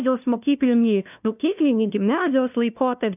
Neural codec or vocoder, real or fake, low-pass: codec, 16 kHz, 0.5 kbps, FunCodec, trained on LibriTTS, 25 frames a second; fake; 3.6 kHz